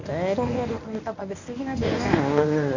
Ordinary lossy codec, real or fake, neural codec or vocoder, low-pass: none; fake; codec, 24 kHz, 0.9 kbps, WavTokenizer, medium speech release version 1; 7.2 kHz